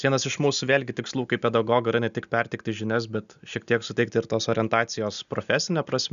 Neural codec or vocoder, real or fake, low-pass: none; real; 7.2 kHz